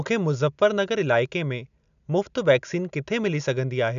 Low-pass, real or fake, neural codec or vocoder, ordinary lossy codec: 7.2 kHz; real; none; none